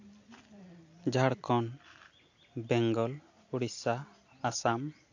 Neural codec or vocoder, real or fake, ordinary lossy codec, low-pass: none; real; AAC, 48 kbps; 7.2 kHz